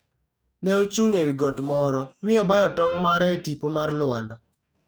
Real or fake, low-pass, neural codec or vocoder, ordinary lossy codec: fake; none; codec, 44.1 kHz, 2.6 kbps, DAC; none